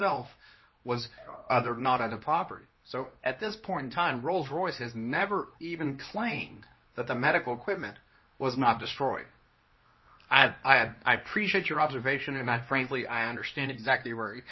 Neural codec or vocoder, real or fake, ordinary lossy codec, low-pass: codec, 24 kHz, 0.9 kbps, WavTokenizer, medium speech release version 2; fake; MP3, 24 kbps; 7.2 kHz